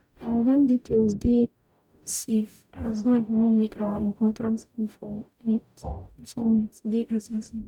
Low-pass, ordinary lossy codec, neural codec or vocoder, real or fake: 19.8 kHz; none; codec, 44.1 kHz, 0.9 kbps, DAC; fake